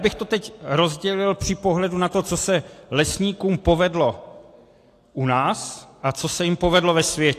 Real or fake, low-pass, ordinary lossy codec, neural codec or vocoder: real; 14.4 kHz; AAC, 64 kbps; none